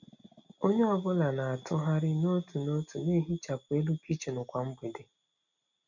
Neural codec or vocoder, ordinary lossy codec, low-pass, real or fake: none; none; 7.2 kHz; real